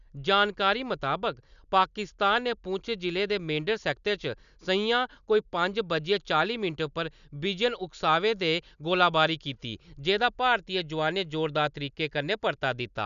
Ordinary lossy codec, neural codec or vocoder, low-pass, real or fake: none; none; 7.2 kHz; real